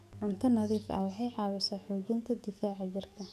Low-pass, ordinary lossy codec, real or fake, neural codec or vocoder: 14.4 kHz; none; fake; codec, 44.1 kHz, 7.8 kbps, DAC